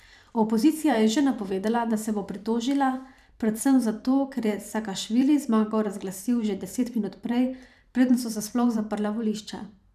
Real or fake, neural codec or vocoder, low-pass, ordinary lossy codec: fake; vocoder, 44.1 kHz, 128 mel bands every 512 samples, BigVGAN v2; 14.4 kHz; none